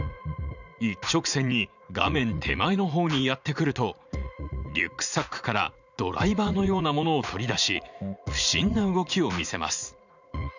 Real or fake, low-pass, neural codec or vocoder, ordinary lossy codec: fake; 7.2 kHz; vocoder, 44.1 kHz, 80 mel bands, Vocos; none